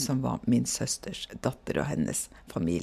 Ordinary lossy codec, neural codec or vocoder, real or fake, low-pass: MP3, 96 kbps; none; real; 14.4 kHz